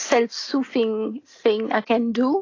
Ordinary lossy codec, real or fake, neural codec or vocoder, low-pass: AAC, 32 kbps; real; none; 7.2 kHz